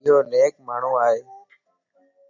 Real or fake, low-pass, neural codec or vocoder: real; 7.2 kHz; none